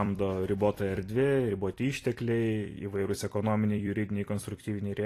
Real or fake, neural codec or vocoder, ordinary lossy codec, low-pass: fake; vocoder, 44.1 kHz, 128 mel bands every 256 samples, BigVGAN v2; AAC, 48 kbps; 14.4 kHz